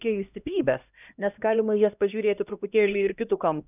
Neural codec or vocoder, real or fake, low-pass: codec, 16 kHz, 1 kbps, X-Codec, HuBERT features, trained on LibriSpeech; fake; 3.6 kHz